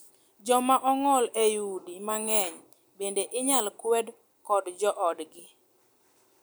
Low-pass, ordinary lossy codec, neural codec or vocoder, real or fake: none; none; none; real